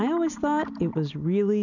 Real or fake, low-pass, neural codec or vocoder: real; 7.2 kHz; none